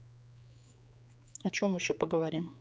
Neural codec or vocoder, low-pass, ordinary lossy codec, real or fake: codec, 16 kHz, 4 kbps, X-Codec, HuBERT features, trained on general audio; none; none; fake